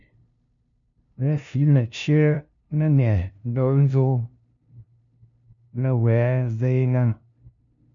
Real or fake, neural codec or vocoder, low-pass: fake; codec, 16 kHz, 0.5 kbps, FunCodec, trained on LibriTTS, 25 frames a second; 7.2 kHz